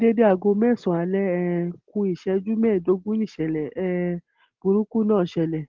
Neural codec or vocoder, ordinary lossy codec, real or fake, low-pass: none; Opus, 16 kbps; real; 7.2 kHz